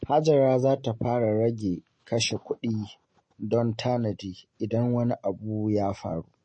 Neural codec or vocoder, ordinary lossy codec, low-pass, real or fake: none; MP3, 32 kbps; 9.9 kHz; real